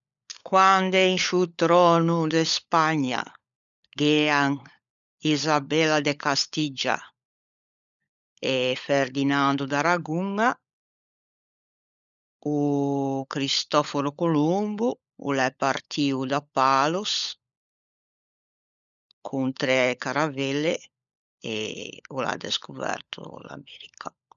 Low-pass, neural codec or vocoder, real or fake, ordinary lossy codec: 7.2 kHz; codec, 16 kHz, 16 kbps, FunCodec, trained on LibriTTS, 50 frames a second; fake; none